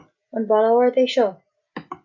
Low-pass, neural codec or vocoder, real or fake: 7.2 kHz; none; real